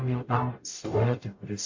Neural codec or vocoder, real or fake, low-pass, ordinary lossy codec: codec, 44.1 kHz, 0.9 kbps, DAC; fake; 7.2 kHz; AAC, 48 kbps